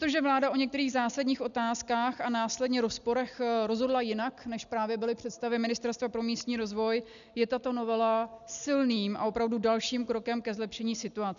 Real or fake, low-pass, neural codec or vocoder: real; 7.2 kHz; none